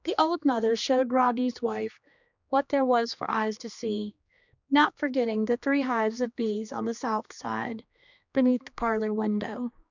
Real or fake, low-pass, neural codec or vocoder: fake; 7.2 kHz; codec, 16 kHz, 2 kbps, X-Codec, HuBERT features, trained on general audio